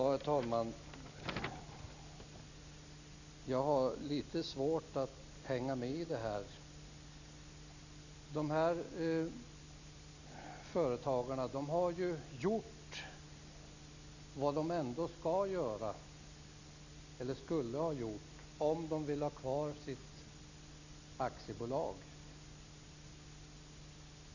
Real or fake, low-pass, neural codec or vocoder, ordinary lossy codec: real; 7.2 kHz; none; none